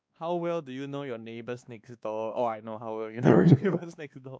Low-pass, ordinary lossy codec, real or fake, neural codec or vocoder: none; none; fake; codec, 16 kHz, 2 kbps, X-Codec, WavLM features, trained on Multilingual LibriSpeech